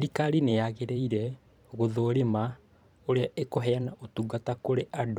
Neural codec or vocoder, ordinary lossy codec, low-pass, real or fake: vocoder, 44.1 kHz, 128 mel bands every 512 samples, BigVGAN v2; none; 19.8 kHz; fake